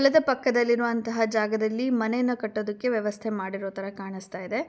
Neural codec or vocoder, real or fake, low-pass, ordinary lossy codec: none; real; none; none